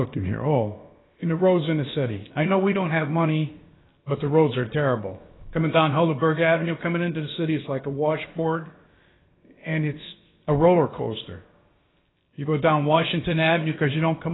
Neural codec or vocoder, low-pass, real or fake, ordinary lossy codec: codec, 16 kHz, about 1 kbps, DyCAST, with the encoder's durations; 7.2 kHz; fake; AAC, 16 kbps